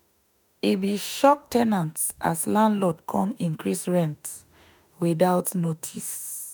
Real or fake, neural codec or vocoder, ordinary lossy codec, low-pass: fake; autoencoder, 48 kHz, 32 numbers a frame, DAC-VAE, trained on Japanese speech; none; none